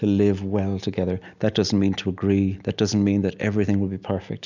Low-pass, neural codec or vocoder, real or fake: 7.2 kHz; none; real